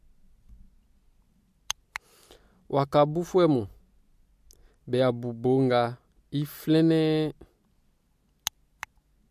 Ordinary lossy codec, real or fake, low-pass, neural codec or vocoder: MP3, 64 kbps; real; 14.4 kHz; none